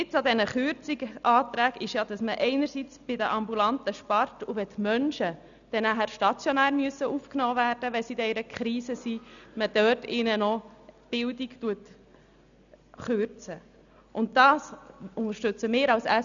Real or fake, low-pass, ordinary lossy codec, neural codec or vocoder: real; 7.2 kHz; none; none